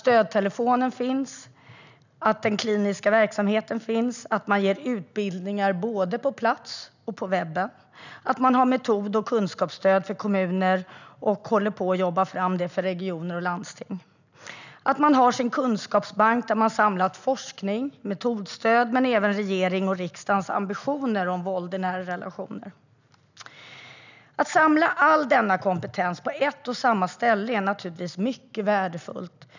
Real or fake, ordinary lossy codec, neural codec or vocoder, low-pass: real; none; none; 7.2 kHz